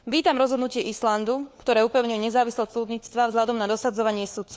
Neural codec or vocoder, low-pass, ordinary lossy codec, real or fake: codec, 16 kHz, 4 kbps, FunCodec, trained on LibriTTS, 50 frames a second; none; none; fake